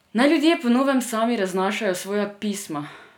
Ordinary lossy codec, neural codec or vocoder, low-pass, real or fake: none; none; 19.8 kHz; real